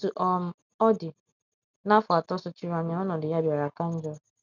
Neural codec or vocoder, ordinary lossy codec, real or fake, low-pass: none; none; real; 7.2 kHz